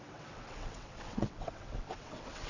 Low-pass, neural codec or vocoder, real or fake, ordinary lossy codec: 7.2 kHz; vocoder, 22.05 kHz, 80 mel bands, WaveNeXt; fake; none